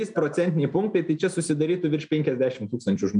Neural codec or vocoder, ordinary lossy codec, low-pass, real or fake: none; MP3, 96 kbps; 9.9 kHz; real